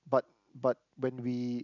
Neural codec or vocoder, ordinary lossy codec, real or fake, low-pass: none; none; real; 7.2 kHz